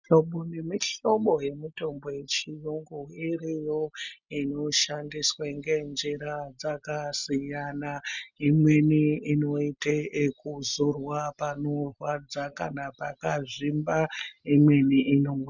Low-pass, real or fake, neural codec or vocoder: 7.2 kHz; real; none